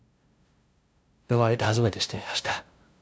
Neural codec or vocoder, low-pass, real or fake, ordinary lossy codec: codec, 16 kHz, 0.5 kbps, FunCodec, trained on LibriTTS, 25 frames a second; none; fake; none